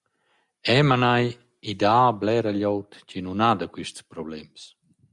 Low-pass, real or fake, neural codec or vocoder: 10.8 kHz; real; none